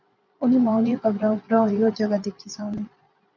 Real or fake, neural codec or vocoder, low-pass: fake; vocoder, 22.05 kHz, 80 mel bands, Vocos; 7.2 kHz